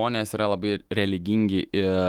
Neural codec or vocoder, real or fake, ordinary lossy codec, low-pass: autoencoder, 48 kHz, 128 numbers a frame, DAC-VAE, trained on Japanese speech; fake; Opus, 24 kbps; 19.8 kHz